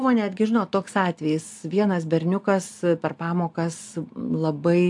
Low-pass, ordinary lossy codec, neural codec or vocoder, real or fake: 10.8 kHz; AAC, 64 kbps; none; real